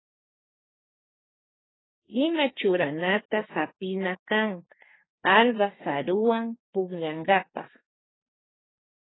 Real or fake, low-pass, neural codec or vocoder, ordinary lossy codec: fake; 7.2 kHz; codec, 32 kHz, 1.9 kbps, SNAC; AAC, 16 kbps